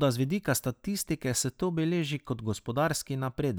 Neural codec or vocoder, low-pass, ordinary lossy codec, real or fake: none; none; none; real